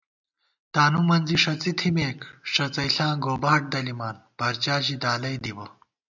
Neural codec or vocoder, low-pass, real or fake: none; 7.2 kHz; real